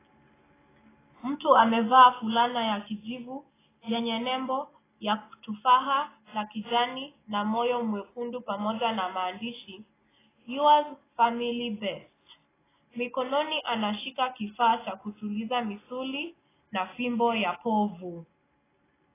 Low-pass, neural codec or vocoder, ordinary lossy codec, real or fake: 3.6 kHz; none; AAC, 16 kbps; real